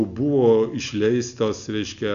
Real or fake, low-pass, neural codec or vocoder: real; 7.2 kHz; none